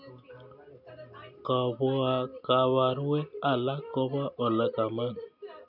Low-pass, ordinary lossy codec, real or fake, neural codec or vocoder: 5.4 kHz; none; real; none